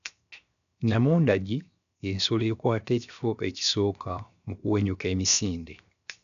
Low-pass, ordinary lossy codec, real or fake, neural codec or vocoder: 7.2 kHz; none; fake; codec, 16 kHz, 0.7 kbps, FocalCodec